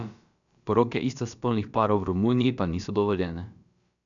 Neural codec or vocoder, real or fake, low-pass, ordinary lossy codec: codec, 16 kHz, about 1 kbps, DyCAST, with the encoder's durations; fake; 7.2 kHz; none